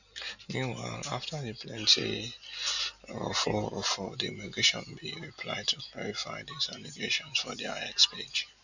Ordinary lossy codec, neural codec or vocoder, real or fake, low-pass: none; none; real; 7.2 kHz